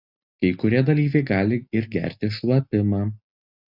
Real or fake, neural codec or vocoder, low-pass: real; none; 5.4 kHz